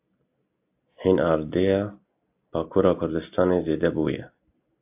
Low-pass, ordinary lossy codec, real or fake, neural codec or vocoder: 3.6 kHz; AAC, 32 kbps; real; none